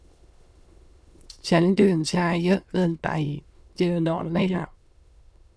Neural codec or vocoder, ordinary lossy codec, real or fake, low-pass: autoencoder, 22.05 kHz, a latent of 192 numbers a frame, VITS, trained on many speakers; none; fake; none